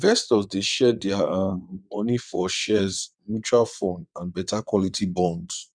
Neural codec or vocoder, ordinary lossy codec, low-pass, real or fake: vocoder, 22.05 kHz, 80 mel bands, WaveNeXt; none; 9.9 kHz; fake